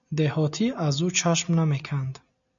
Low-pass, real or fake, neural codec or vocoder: 7.2 kHz; real; none